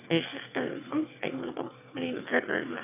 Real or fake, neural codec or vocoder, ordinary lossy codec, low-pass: fake; autoencoder, 22.05 kHz, a latent of 192 numbers a frame, VITS, trained on one speaker; none; 3.6 kHz